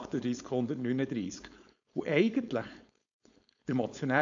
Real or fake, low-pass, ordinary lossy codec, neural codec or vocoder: fake; 7.2 kHz; AAC, 64 kbps; codec, 16 kHz, 4.8 kbps, FACodec